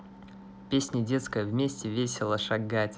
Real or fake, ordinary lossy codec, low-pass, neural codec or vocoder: real; none; none; none